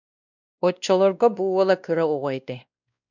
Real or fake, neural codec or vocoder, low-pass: fake; codec, 16 kHz, 1 kbps, X-Codec, WavLM features, trained on Multilingual LibriSpeech; 7.2 kHz